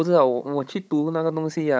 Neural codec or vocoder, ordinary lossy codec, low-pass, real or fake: codec, 16 kHz, 16 kbps, FunCodec, trained on Chinese and English, 50 frames a second; none; none; fake